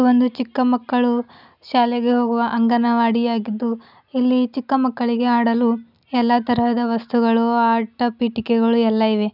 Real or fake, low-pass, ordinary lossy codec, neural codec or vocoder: real; 5.4 kHz; none; none